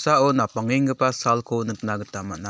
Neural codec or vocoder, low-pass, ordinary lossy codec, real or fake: none; none; none; real